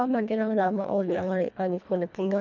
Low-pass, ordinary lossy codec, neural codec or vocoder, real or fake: 7.2 kHz; none; codec, 24 kHz, 1.5 kbps, HILCodec; fake